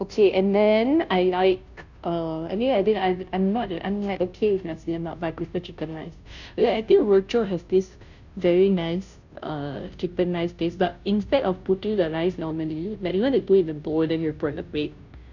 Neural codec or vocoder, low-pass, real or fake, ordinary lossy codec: codec, 16 kHz, 0.5 kbps, FunCodec, trained on Chinese and English, 25 frames a second; 7.2 kHz; fake; none